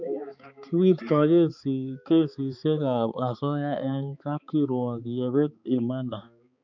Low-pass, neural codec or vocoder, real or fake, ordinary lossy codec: 7.2 kHz; codec, 16 kHz, 4 kbps, X-Codec, HuBERT features, trained on balanced general audio; fake; none